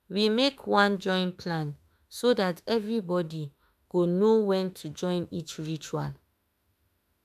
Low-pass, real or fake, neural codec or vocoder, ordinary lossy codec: 14.4 kHz; fake; autoencoder, 48 kHz, 32 numbers a frame, DAC-VAE, trained on Japanese speech; none